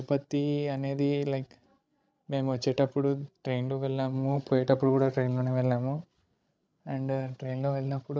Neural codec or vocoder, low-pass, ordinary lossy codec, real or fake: codec, 16 kHz, 16 kbps, FreqCodec, larger model; none; none; fake